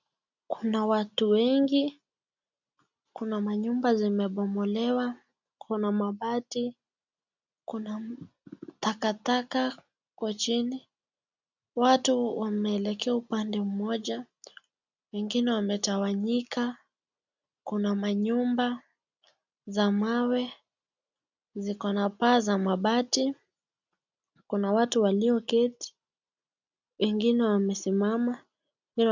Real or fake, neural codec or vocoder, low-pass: real; none; 7.2 kHz